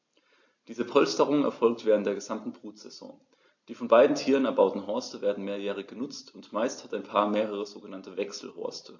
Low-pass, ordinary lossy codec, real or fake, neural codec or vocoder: 7.2 kHz; AAC, 48 kbps; real; none